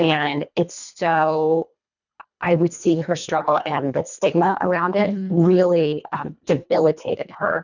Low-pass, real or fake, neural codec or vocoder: 7.2 kHz; fake; codec, 24 kHz, 1.5 kbps, HILCodec